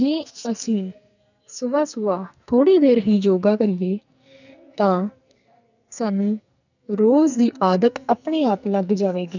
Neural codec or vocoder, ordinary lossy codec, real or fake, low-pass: codec, 44.1 kHz, 2.6 kbps, SNAC; none; fake; 7.2 kHz